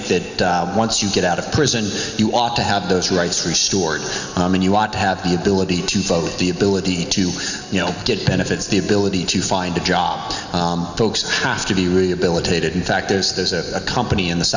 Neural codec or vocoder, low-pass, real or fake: none; 7.2 kHz; real